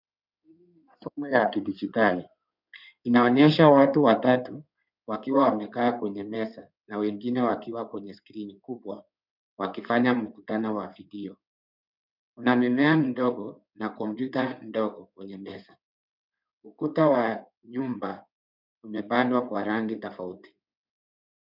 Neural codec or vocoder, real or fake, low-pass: codec, 16 kHz in and 24 kHz out, 2.2 kbps, FireRedTTS-2 codec; fake; 5.4 kHz